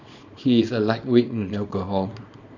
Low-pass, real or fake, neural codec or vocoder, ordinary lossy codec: 7.2 kHz; fake; codec, 24 kHz, 0.9 kbps, WavTokenizer, small release; none